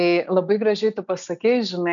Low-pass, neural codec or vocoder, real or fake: 7.2 kHz; none; real